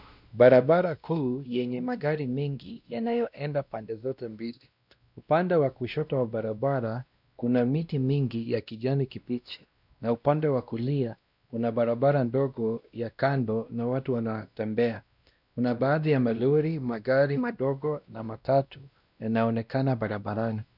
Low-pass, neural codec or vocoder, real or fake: 5.4 kHz; codec, 16 kHz, 1 kbps, X-Codec, WavLM features, trained on Multilingual LibriSpeech; fake